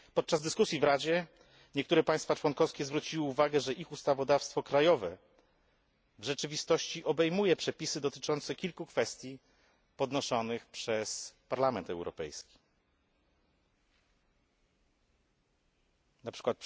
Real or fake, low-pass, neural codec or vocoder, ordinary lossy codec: real; none; none; none